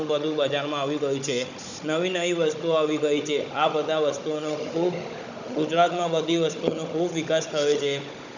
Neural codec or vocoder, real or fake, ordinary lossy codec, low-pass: codec, 16 kHz, 16 kbps, FunCodec, trained on Chinese and English, 50 frames a second; fake; none; 7.2 kHz